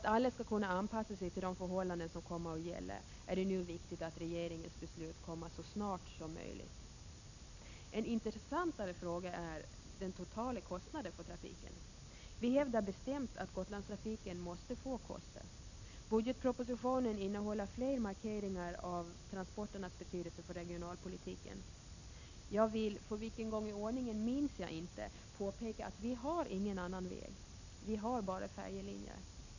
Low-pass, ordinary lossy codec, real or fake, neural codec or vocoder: 7.2 kHz; none; real; none